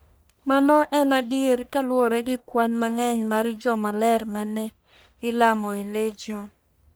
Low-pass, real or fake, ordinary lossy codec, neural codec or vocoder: none; fake; none; codec, 44.1 kHz, 1.7 kbps, Pupu-Codec